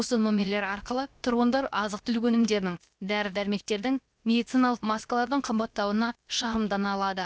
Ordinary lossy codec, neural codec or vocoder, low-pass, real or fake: none; codec, 16 kHz, 0.7 kbps, FocalCodec; none; fake